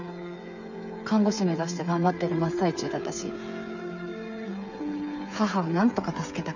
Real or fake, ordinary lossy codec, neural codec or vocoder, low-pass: fake; none; codec, 16 kHz, 8 kbps, FreqCodec, smaller model; 7.2 kHz